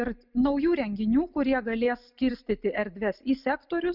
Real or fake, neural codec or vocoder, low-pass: real; none; 5.4 kHz